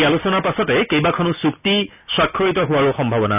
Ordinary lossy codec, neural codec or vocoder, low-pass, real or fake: none; none; 3.6 kHz; real